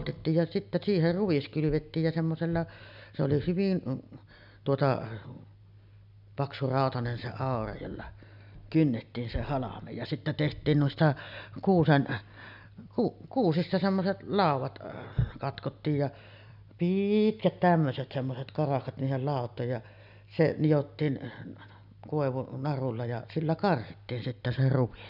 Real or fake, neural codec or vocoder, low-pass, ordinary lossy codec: real; none; 5.4 kHz; none